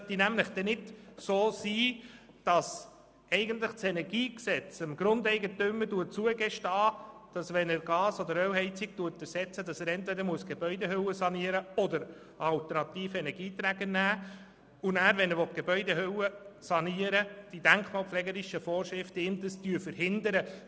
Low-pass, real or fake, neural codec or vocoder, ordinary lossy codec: none; real; none; none